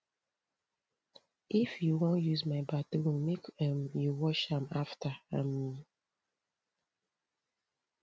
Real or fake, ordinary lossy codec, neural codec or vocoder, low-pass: real; none; none; none